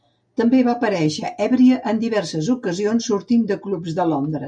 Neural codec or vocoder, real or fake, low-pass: none; real; 9.9 kHz